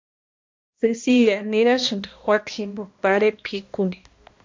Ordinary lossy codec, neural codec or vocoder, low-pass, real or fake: MP3, 48 kbps; codec, 16 kHz, 1 kbps, X-Codec, HuBERT features, trained on balanced general audio; 7.2 kHz; fake